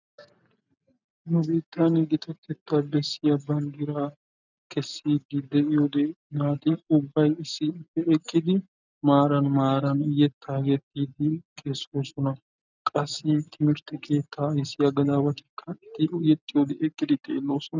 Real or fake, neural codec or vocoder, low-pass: real; none; 7.2 kHz